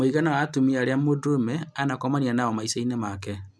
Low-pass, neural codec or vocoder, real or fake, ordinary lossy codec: none; none; real; none